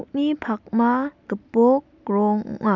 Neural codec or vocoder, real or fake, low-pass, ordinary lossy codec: none; real; 7.2 kHz; none